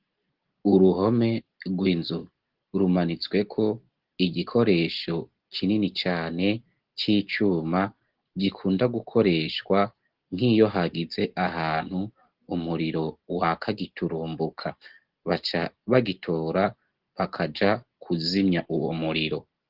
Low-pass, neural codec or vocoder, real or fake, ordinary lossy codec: 5.4 kHz; none; real; Opus, 16 kbps